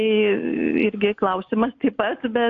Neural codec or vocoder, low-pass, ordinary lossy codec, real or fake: none; 7.2 kHz; AAC, 48 kbps; real